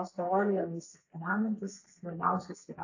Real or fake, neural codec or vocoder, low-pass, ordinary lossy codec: fake; codec, 32 kHz, 1.9 kbps, SNAC; 7.2 kHz; AAC, 48 kbps